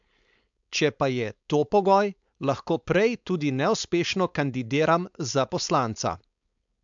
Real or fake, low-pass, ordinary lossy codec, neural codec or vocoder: fake; 7.2 kHz; MP3, 64 kbps; codec, 16 kHz, 4.8 kbps, FACodec